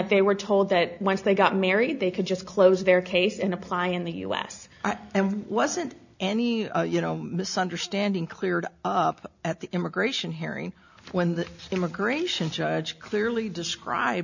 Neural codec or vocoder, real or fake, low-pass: none; real; 7.2 kHz